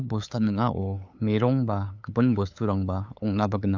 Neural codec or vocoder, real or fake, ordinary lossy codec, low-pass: codec, 16 kHz, 8 kbps, FunCodec, trained on LibriTTS, 25 frames a second; fake; AAC, 48 kbps; 7.2 kHz